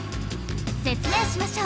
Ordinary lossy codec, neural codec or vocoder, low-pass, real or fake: none; none; none; real